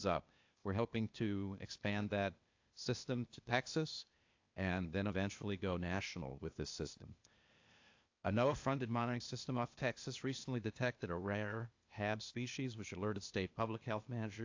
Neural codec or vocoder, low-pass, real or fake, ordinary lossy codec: codec, 16 kHz, 0.8 kbps, ZipCodec; 7.2 kHz; fake; AAC, 48 kbps